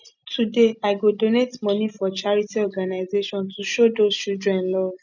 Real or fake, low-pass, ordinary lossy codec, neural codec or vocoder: real; 7.2 kHz; none; none